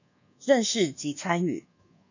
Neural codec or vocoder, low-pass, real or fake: codec, 24 kHz, 1.2 kbps, DualCodec; 7.2 kHz; fake